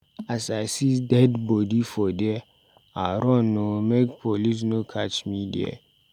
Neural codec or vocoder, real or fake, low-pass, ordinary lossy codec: none; real; 19.8 kHz; none